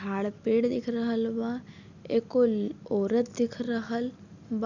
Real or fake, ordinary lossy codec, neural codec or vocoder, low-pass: real; none; none; 7.2 kHz